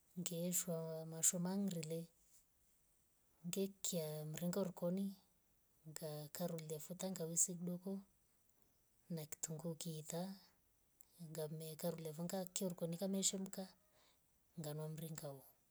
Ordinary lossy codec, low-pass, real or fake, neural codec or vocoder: none; none; real; none